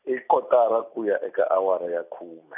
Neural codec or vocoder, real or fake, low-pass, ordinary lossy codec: none; real; 3.6 kHz; none